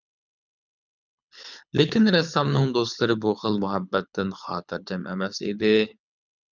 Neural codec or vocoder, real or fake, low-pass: codec, 24 kHz, 6 kbps, HILCodec; fake; 7.2 kHz